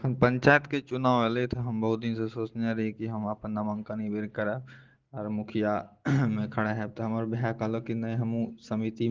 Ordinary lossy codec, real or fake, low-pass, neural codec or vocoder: Opus, 16 kbps; real; 7.2 kHz; none